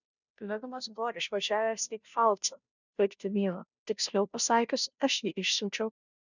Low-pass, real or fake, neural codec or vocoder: 7.2 kHz; fake; codec, 16 kHz, 0.5 kbps, FunCodec, trained on Chinese and English, 25 frames a second